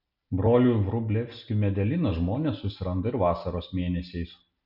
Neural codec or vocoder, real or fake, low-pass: none; real; 5.4 kHz